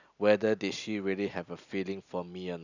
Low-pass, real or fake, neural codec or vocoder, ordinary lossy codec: 7.2 kHz; real; none; none